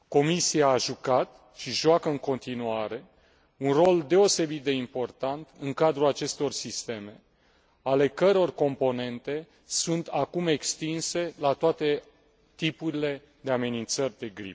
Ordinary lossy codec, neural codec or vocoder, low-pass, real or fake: none; none; none; real